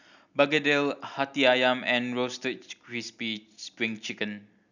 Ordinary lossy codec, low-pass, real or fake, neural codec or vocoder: none; 7.2 kHz; real; none